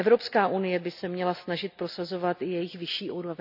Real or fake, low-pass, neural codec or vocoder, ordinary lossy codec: real; 5.4 kHz; none; none